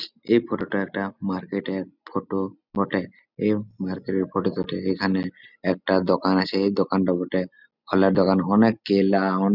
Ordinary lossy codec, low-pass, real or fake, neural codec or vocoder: none; 5.4 kHz; real; none